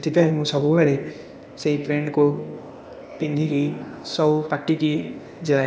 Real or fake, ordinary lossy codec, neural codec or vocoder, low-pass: fake; none; codec, 16 kHz, 0.8 kbps, ZipCodec; none